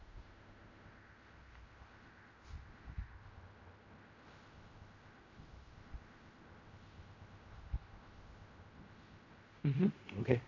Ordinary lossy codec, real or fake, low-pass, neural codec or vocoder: MP3, 48 kbps; fake; 7.2 kHz; codec, 16 kHz in and 24 kHz out, 0.4 kbps, LongCat-Audio-Codec, fine tuned four codebook decoder